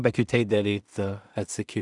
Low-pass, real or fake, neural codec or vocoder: 10.8 kHz; fake; codec, 16 kHz in and 24 kHz out, 0.4 kbps, LongCat-Audio-Codec, two codebook decoder